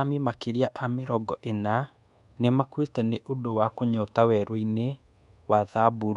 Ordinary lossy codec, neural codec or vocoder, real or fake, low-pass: none; codec, 24 kHz, 1.2 kbps, DualCodec; fake; 10.8 kHz